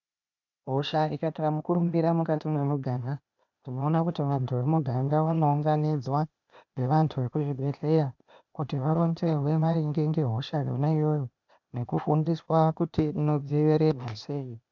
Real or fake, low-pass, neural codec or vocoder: fake; 7.2 kHz; codec, 16 kHz, 0.8 kbps, ZipCodec